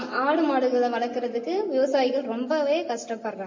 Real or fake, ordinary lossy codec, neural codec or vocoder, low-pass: real; MP3, 32 kbps; none; 7.2 kHz